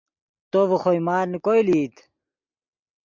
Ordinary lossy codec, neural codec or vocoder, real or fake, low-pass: MP3, 64 kbps; none; real; 7.2 kHz